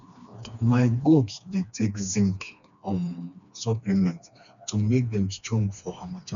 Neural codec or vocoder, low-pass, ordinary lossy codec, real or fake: codec, 16 kHz, 2 kbps, FreqCodec, smaller model; 7.2 kHz; none; fake